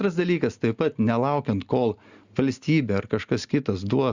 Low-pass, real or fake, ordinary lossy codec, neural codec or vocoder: 7.2 kHz; real; Opus, 64 kbps; none